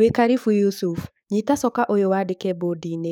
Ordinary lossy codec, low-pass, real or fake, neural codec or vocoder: none; 19.8 kHz; fake; codec, 44.1 kHz, 7.8 kbps, DAC